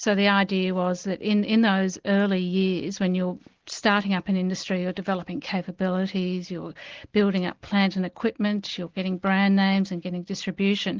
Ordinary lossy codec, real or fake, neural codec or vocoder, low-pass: Opus, 16 kbps; real; none; 7.2 kHz